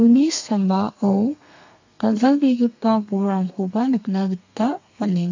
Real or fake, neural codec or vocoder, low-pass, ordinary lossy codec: fake; codec, 32 kHz, 1.9 kbps, SNAC; 7.2 kHz; AAC, 48 kbps